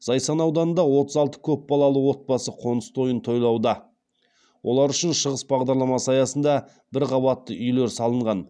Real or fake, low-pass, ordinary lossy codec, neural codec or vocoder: real; 9.9 kHz; none; none